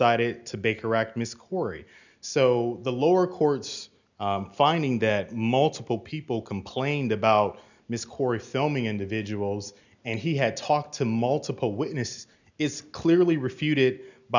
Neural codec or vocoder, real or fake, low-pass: none; real; 7.2 kHz